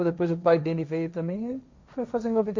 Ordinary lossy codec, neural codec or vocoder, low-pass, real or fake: MP3, 48 kbps; codec, 16 kHz, 1.1 kbps, Voila-Tokenizer; 7.2 kHz; fake